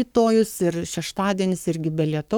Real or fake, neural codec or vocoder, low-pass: fake; codec, 44.1 kHz, 7.8 kbps, Pupu-Codec; 19.8 kHz